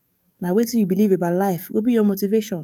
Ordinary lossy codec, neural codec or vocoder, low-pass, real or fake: none; codec, 44.1 kHz, 7.8 kbps, DAC; 19.8 kHz; fake